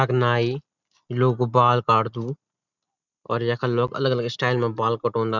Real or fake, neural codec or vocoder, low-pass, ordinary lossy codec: real; none; 7.2 kHz; none